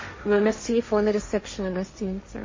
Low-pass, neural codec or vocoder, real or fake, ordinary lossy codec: 7.2 kHz; codec, 16 kHz, 1.1 kbps, Voila-Tokenizer; fake; MP3, 32 kbps